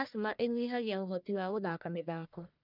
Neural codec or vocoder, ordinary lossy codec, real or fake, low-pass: codec, 44.1 kHz, 1.7 kbps, Pupu-Codec; none; fake; 5.4 kHz